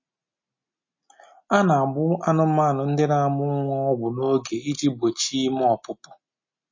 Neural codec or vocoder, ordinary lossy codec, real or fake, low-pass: none; MP3, 32 kbps; real; 7.2 kHz